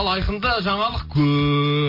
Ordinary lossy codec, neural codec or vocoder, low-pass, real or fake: MP3, 32 kbps; none; 5.4 kHz; real